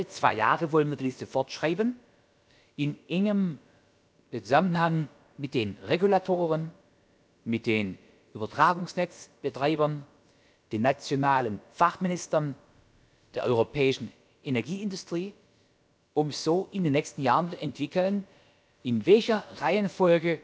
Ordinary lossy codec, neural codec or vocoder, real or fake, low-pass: none; codec, 16 kHz, about 1 kbps, DyCAST, with the encoder's durations; fake; none